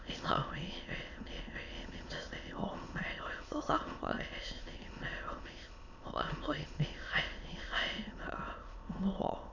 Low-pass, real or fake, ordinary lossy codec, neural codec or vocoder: 7.2 kHz; fake; none; autoencoder, 22.05 kHz, a latent of 192 numbers a frame, VITS, trained on many speakers